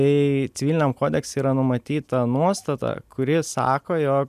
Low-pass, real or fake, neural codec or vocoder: 14.4 kHz; real; none